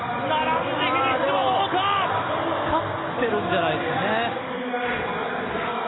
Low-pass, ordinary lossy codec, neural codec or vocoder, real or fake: 7.2 kHz; AAC, 16 kbps; none; real